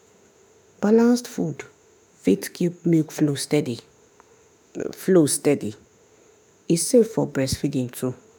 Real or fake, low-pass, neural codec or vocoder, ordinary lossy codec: fake; none; autoencoder, 48 kHz, 32 numbers a frame, DAC-VAE, trained on Japanese speech; none